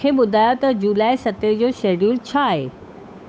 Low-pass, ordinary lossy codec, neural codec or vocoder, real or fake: none; none; codec, 16 kHz, 8 kbps, FunCodec, trained on Chinese and English, 25 frames a second; fake